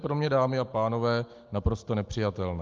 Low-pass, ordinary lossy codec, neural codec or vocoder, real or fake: 7.2 kHz; Opus, 32 kbps; none; real